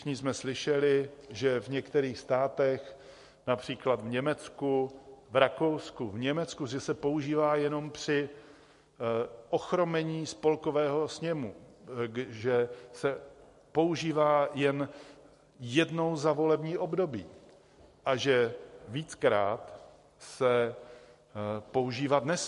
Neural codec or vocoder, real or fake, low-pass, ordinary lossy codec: none; real; 14.4 kHz; MP3, 48 kbps